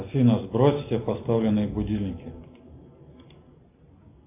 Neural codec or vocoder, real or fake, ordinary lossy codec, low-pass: none; real; AAC, 24 kbps; 3.6 kHz